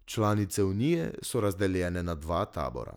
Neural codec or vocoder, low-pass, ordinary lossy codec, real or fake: none; none; none; real